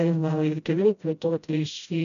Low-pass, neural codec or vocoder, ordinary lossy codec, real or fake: 7.2 kHz; codec, 16 kHz, 0.5 kbps, FreqCodec, smaller model; MP3, 96 kbps; fake